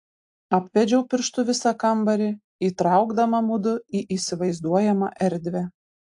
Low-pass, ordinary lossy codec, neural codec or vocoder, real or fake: 10.8 kHz; AAC, 64 kbps; none; real